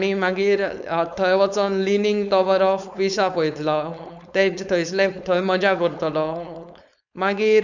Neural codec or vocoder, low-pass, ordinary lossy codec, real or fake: codec, 16 kHz, 4.8 kbps, FACodec; 7.2 kHz; none; fake